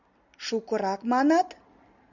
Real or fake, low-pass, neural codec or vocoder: real; 7.2 kHz; none